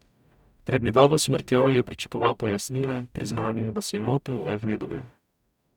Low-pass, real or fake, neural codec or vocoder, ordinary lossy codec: 19.8 kHz; fake; codec, 44.1 kHz, 0.9 kbps, DAC; none